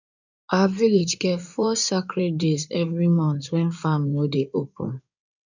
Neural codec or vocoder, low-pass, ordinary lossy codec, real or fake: codec, 16 kHz in and 24 kHz out, 2.2 kbps, FireRedTTS-2 codec; 7.2 kHz; none; fake